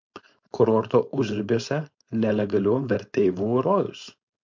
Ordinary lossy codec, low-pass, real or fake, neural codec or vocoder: MP3, 48 kbps; 7.2 kHz; fake; codec, 16 kHz, 4.8 kbps, FACodec